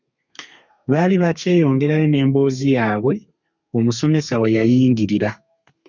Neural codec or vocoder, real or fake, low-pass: codec, 32 kHz, 1.9 kbps, SNAC; fake; 7.2 kHz